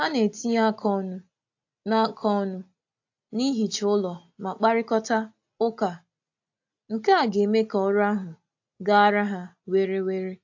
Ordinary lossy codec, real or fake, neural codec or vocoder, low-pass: AAC, 48 kbps; real; none; 7.2 kHz